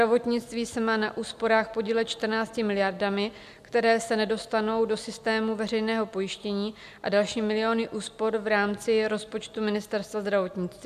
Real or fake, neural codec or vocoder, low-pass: real; none; 14.4 kHz